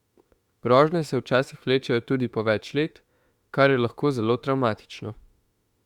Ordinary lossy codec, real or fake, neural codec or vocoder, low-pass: Opus, 64 kbps; fake; autoencoder, 48 kHz, 32 numbers a frame, DAC-VAE, trained on Japanese speech; 19.8 kHz